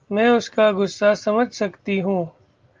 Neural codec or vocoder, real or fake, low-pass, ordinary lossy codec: none; real; 7.2 kHz; Opus, 32 kbps